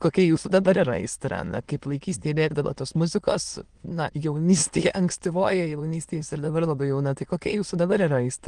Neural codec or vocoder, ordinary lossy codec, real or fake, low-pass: autoencoder, 22.05 kHz, a latent of 192 numbers a frame, VITS, trained on many speakers; Opus, 24 kbps; fake; 9.9 kHz